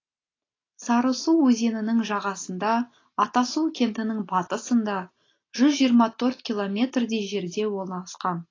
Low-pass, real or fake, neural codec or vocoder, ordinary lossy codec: 7.2 kHz; real; none; AAC, 32 kbps